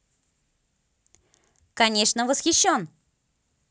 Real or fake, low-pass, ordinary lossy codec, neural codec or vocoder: real; none; none; none